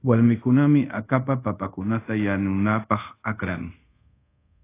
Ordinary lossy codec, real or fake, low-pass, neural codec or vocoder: AAC, 24 kbps; fake; 3.6 kHz; codec, 24 kHz, 0.5 kbps, DualCodec